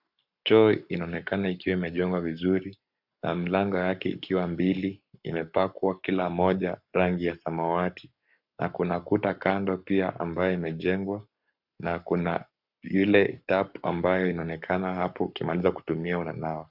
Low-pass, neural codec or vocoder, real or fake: 5.4 kHz; codec, 44.1 kHz, 7.8 kbps, Pupu-Codec; fake